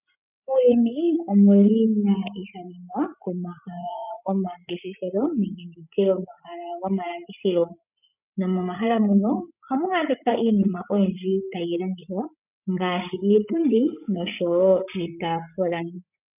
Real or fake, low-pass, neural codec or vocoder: fake; 3.6 kHz; codec, 16 kHz, 8 kbps, FreqCodec, larger model